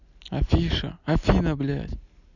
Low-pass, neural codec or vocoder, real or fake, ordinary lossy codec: 7.2 kHz; none; real; none